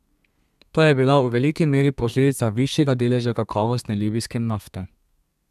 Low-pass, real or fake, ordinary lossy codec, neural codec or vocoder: 14.4 kHz; fake; none; codec, 32 kHz, 1.9 kbps, SNAC